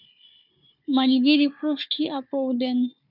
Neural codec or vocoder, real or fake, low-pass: codec, 16 kHz, 4 kbps, FunCodec, trained on Chinese and English, 50 frames a second; fake; 5.4 kHz